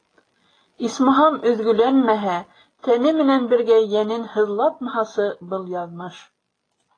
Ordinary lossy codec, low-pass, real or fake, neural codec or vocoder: AAC, 32 kbps; 9.9 kHz; real; none